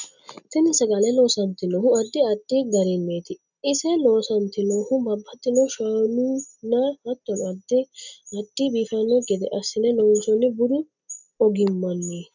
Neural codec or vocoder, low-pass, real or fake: none; 7.2 kHz; real